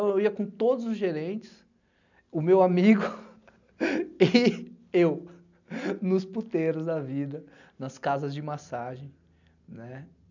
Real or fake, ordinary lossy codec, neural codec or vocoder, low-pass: real; none; none; 7.2 kHz